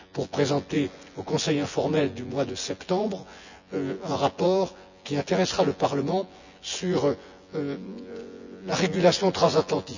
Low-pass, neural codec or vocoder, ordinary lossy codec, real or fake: 7.2 kHz; vocoder, 24 kHz, 100 mel bands, Vocos; none; fake